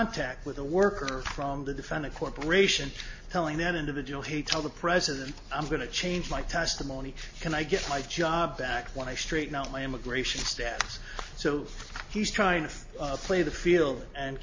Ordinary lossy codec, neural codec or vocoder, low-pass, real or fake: MP3, 32 kbps; none; 7.2 kHz; real